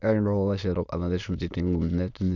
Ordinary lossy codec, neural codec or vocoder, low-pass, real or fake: none; autoencoder, 22.05 kHz, a latent of 192 numbers a frame, VITS, trained on many speakers; 7.2 kHz; fake